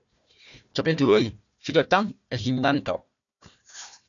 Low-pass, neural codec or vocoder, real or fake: 7.2 kHz; codec, 16 kHz, 1 kbps, FunCodec, trained on Chinese and English, 50 frames a second; fake